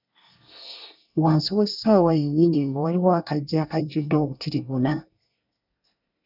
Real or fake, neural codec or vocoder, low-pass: fake; codec, 24 kHz, 1 kbps, SNAC; 5.4 kHz